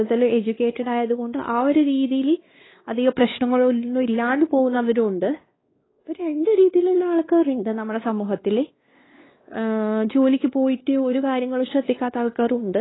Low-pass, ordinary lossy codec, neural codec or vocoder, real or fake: 7.2 kHz; AAC, 16 kbps; codec, 16 kHz, 2 kbps, X-Codec, WavLM features, trained on Multilingual LibriSpeech; fake